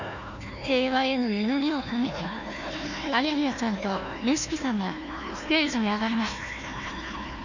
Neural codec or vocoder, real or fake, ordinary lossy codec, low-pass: codec, 16 kHz, 1 kbps, FunCodec, trained on Chinese and English, 50 frames a second; fake; none; 7.2 kHz